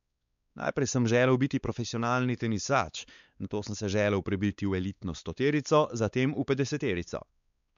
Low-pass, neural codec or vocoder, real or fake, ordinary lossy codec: 7.2 kHz; codec, 16 kHz, 4 kbps, X-Codec, WavLM features, trained on Multilingual LibriSpeech; fake; MP3, 96 kbps